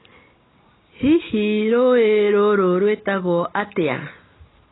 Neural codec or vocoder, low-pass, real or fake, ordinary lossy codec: none; 7.2 kHz; real; AAC, 16 kbps